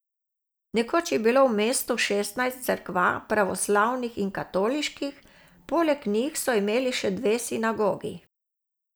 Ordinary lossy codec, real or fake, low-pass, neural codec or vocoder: none; real; none; none